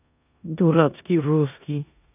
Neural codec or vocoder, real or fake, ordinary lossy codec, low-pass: codec, 16 kHz in and 24 kHz out, 0.9 kbps, LongCat-Audio-Codec, four codebook decoder; fake; none; 3.6 kHz